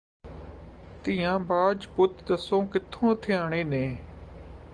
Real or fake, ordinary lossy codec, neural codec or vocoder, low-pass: real; Opus, 24 kbps; none; 9.9 kHz